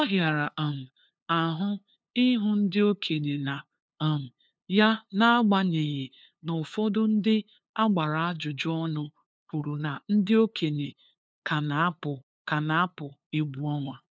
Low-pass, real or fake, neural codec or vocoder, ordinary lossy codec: none; fake; codec, 16 kHz, 2 kbps, FunCodec, trained on LibriTTS, 25 frames a second; none